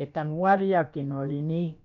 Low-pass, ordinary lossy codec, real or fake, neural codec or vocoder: 7.2 kHz; none; fake; codec, 16 kHz, about 1 kbps, DyCAST, with the encoder's durations